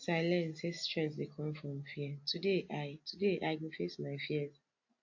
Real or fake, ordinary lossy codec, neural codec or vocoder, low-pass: real; AAC, 48 kbps; none; 7.2 kHz